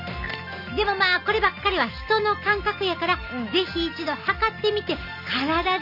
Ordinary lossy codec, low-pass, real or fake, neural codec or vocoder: none; 5.4 kHz; real; none